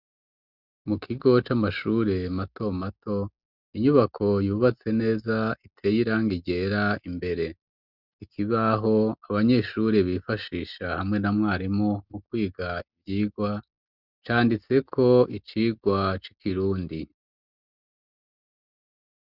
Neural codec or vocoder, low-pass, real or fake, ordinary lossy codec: none; 5.4 kHz; real; Opus, 64 kbps